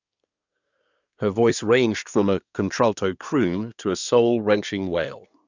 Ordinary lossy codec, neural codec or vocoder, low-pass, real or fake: none; codec, 24 kHz, 1 kbps, SNAC; 7.2 kHz; fake